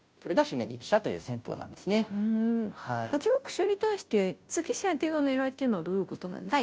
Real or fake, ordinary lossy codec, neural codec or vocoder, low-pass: fake; none; codec, 16 kHz, 0.5 kbps, FunCodec, trained on Chinese and English, 25 frames a second; none